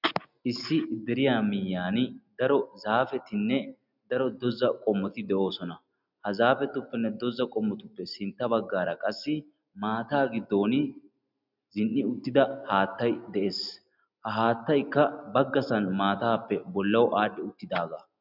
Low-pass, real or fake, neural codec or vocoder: 5.4 kHz; real; none